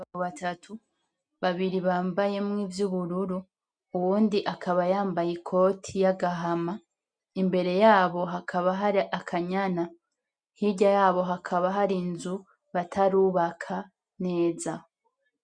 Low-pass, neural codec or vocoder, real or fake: 9.9 kHz; none; real